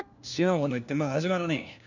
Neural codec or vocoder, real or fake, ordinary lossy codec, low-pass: codec, 16 kHz, 1 kbps, FunCodec, trained on LibriTTS, 50 frames a second; fake; none; 7.2 kHz